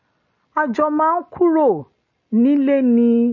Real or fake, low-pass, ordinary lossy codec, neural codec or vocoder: real; 7.2 kHz; MP3, 32 kbps; none